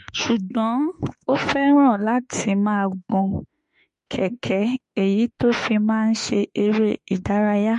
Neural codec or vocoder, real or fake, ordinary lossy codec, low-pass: autoencoder, 48 kHz, 32 numbers a frame, DAC-VAE, trained on Japanese speech; fake; MP3, 48 kbps; 14.4 kHz